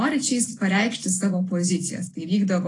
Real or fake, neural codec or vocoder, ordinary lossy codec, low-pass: real; none; AAC, 32 kbps; 10.8 kHz